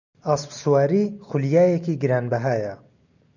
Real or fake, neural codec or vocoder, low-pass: real; none; 7.2 kHz